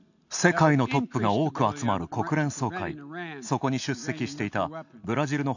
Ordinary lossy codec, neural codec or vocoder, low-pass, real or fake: none; none; 7.2 kHz; real